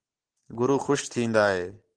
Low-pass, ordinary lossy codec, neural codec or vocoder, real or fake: 9.9 kHz; Opus, 16 kbps; none; real